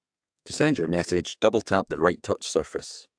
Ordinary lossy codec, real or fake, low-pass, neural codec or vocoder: AAC, 64 kbps; fake; 9.9 kHz; codec, 32 kHz, 1.9 kbps, SNAC